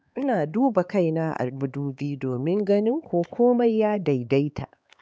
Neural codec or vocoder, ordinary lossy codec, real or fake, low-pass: codec, 16 kHz, 4 kbps, X-Codec, HuBERT features, trained on LibriSpeech; none; fake; none